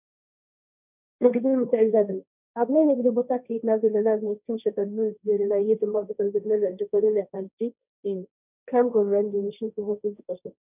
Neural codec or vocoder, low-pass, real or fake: codec, 16 kHz, 1.1 kbps, Voila-Tokenizer; 3.6 kHz; fake